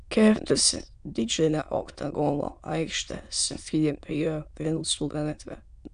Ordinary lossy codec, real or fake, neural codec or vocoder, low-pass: AAC, 96 kbps; fake; autoencoder, 22.05 kHz, a latent of 192 numbers a frame, VITS, trained on many speakers; 9.9 kHz